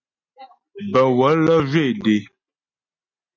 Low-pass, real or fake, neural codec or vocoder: 7.2 kHz; real; none